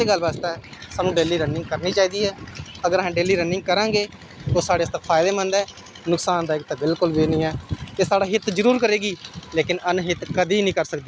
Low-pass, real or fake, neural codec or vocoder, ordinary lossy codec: none; real; none; none